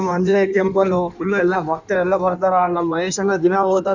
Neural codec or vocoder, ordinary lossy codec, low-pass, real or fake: codec, 16 kHz in and 24 kHz out, 1.1 kbps, FireRedTTS-2 codec; none; 7.2 kHz; fake